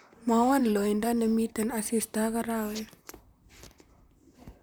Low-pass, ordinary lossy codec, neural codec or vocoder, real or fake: none; none; vocoder, 44.1 kHz, 128 mel bands, Pupu-Vocoder; fake